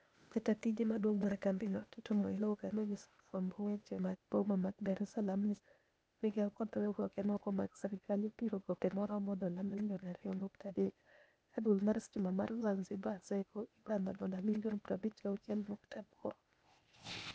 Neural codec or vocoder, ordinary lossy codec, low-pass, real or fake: codec, 16 kHz, 0.8 kbps, ZipCodec; none; none; fake